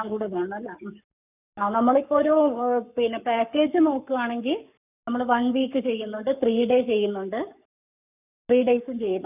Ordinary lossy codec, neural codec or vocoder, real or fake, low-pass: none; codec, 44.1 kHz, 7.8 kbps, Pupu-Codec; fake; 3.6 kHz